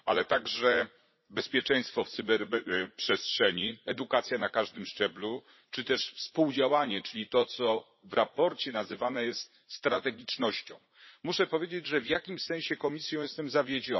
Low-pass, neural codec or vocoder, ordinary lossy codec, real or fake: 7.2 kHz; vocoder, 44.1 kHz, 80 mel bands, Vocos; MP3, 24 kbps; fake